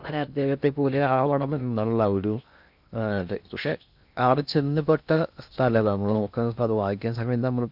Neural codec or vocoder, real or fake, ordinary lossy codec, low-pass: codec, 16 kHz in and 24 kHz out, 0.6 kbps, FocalCodec, streaming, 2048 codes; fake; none; 5.4 kHz